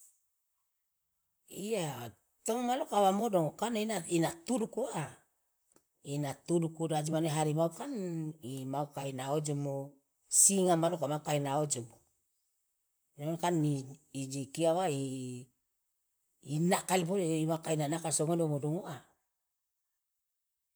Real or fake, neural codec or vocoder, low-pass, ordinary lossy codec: fake; vocoder, 44.1 kHz, 128 mel bands, Pupu-Vocoder; none; none